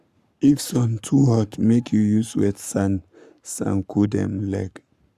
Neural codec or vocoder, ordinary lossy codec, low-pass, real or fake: codec, 44.1 kHz, 7.8 kbps, Pupu-Codec; Opus, 64 kbps; 14.4 kHz; fake